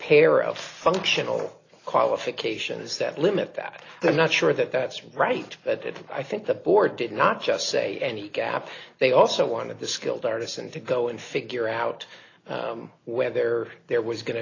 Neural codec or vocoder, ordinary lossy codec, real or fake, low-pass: none; AAC, 32 kbps; real; 7.2 kHz